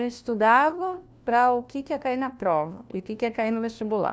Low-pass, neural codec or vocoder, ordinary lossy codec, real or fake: none; codec, 16 kHz, 1 kbps, FunCodec, trained on LibriTTS, 50 frames a second; none; fake